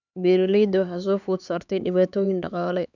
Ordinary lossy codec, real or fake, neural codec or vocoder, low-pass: none; fake; codec, 16 kHz, 4 kbps, X-Codec, HuBERT features, trained on LibriSpeech; 7.2 kHz